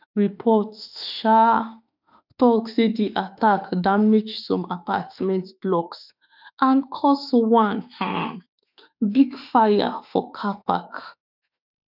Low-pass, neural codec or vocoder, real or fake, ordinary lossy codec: 5.4 kHz; autoencoder, 48 kHz, 32 numbers a frame, DAC-VAE, trained on Japanese speech; fake; none